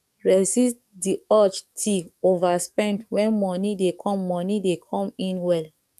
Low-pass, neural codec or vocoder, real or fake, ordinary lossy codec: 14.4 kHz; codec, 44.1 kHz, 7.8 kbps, DAC; fake; none